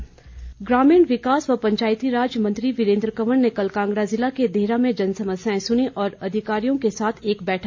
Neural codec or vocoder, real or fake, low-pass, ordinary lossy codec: none; real; 7.2 kHz; AAC, 48 kbps